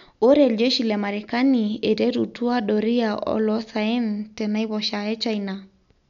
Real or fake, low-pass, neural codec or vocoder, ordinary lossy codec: real; 7.2 kHz; none; none